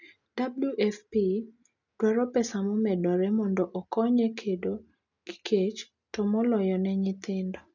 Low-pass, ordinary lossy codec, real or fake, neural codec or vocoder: 7.2 kHz; none; real; none